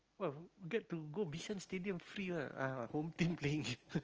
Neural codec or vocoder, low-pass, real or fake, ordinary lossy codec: none; 7.2 kHz; real; Opus, 24 kbps